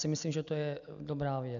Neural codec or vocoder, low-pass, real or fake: none; 7.2 kHz; real